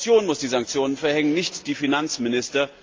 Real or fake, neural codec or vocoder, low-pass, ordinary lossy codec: real; none; 7.2 kHz; Opus, 32 kbps